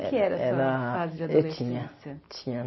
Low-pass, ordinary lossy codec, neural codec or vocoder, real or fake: 7.2 kHz; MP3, 24 kbps; none; real